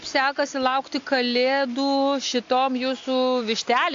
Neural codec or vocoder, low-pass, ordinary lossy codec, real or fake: none; 7.2 kHz; AAC, 48 kbps; real